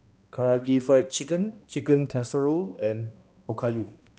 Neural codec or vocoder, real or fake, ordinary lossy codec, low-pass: codec, 16 kHz, 1 kbps, X-Codec, HuBERT features, trained on balanced general audio; fake; none; none